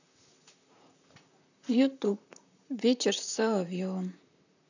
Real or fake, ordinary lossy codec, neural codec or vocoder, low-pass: fake; none; vocoder, 44.1 kHz, 128 mel bands, Pupu-Vocoder; 7.2 kHz